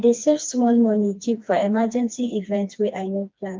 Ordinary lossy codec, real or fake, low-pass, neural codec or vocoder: Opus, 32 kbps; fake; 7.2 kHz; codec, 16 kHz, 2 kbps, FreqCodec, smaller model